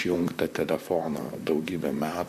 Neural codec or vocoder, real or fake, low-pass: vocoder, 44.1 kHz, 128 mel bands, Pupu-Vocoder; fake; 14.4 kHz